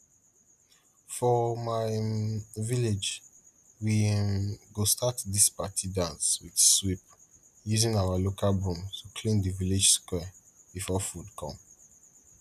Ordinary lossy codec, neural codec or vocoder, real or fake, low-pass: none; none; real; 14.4 kHz